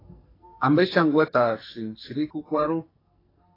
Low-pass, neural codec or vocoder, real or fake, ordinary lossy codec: 5.4 kHz; codec, 44.1 kHz, 2.6 kbps, SNAC; fake; AAC, 24 kbps